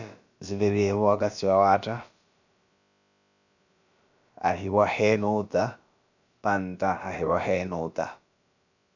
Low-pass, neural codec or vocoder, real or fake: 7.2 kHz; codec, 16 kHz, about 1 kbps, DyCAST, with the encoder's durations; fake